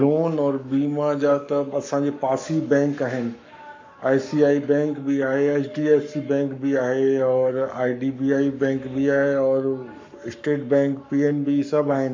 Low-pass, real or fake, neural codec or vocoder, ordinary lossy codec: 7.2 kHz; fake; codec, 44.1 kHz, 7.8 kbps, Pupu-Codec; MP3, 48 kbps